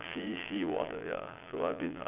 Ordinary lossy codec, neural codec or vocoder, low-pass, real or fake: none; vocoder, 22.05 kHz, 80 mel bands, Vocos; 3.6 kHz; fake